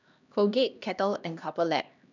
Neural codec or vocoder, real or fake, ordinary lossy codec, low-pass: codec, 16 kHz, 1 kbps, X-Codec, HuBERT features, trained on LibriSpeech; fake; none; 7.2 kHz